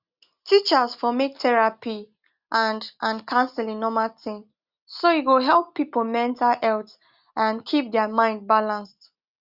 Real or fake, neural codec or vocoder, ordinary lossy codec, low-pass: real; none; Opus, 64 kbps; 5.4 kHz